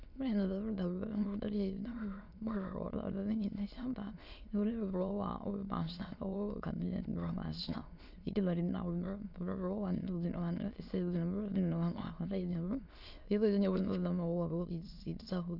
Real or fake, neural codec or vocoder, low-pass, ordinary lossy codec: fake; autoencoder, 22.05 kHz, a latent of 192 numbers a frame, VITS, trained on many speakers; 5.4 kHz; none